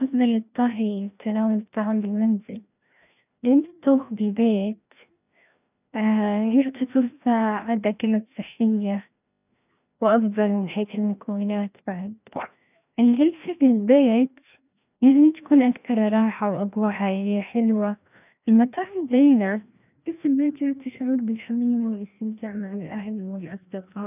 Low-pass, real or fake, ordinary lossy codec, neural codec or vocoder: 3.6 kHz; fake; none; codec, 16 kHz, 1 kbps, FreqCodec, larger model